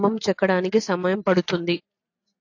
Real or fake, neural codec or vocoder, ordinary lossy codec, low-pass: real; none; AAC, 48 kbps; 7.2 kHz